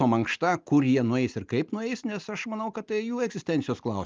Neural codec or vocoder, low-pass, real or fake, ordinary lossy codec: none; 7.2 kHz; real; Opus, 24 kbps